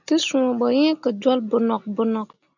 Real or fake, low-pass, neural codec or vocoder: real; 7.2 kHz; none